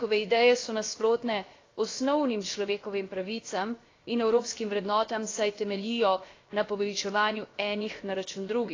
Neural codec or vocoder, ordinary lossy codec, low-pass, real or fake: codec, 16 kHz, 0.7 kbps, FocalCodec; AAC, 32 kbps; 7.2 kHz; fake